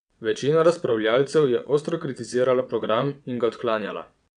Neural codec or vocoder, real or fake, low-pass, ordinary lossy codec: vocoder, 22.05 kHz, 80 mel bands, WaveNeXt; fake; 9.9 kHz; none